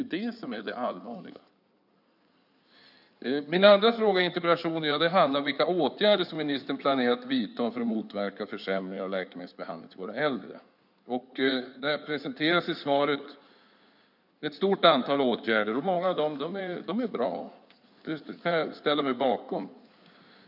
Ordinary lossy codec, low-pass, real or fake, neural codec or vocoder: none; 5.4 kHz; fake; codec, 16 kHz in and 24 kHz out, 2.2 kbps, FireRedTTS-2 codec